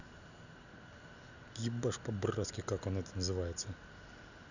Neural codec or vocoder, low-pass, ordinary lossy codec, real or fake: none; 7.2 kHz; none; real